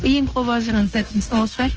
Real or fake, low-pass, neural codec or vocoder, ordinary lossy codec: fake; 7.2 kHz; codec, 16 kHz, 0.9 kbps, LongCat-Audio-Codec; Opus, 16 kbps